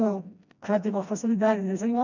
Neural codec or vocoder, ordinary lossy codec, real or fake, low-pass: codec, 16 kHz, 1 kbps, FreqCodec, smaller model; none; fake; 7.2 kHz